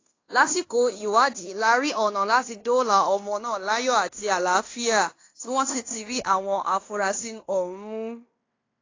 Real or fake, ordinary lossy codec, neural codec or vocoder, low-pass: fake; AAC, 32 kbps; codec, 16 kHz in and 24 kHz out, 0.9 kbps, LongCat-Audio-Codec, fine tuned four codebook decoder; 7.2 kHz